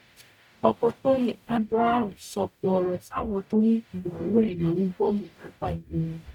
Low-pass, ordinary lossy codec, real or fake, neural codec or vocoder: 19.8 kHz; none; fake; codec, 44.1 kHz, 0.9 kbps, DAC